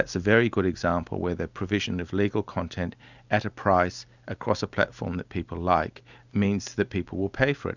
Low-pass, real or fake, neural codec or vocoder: 7.2 kHz; real; none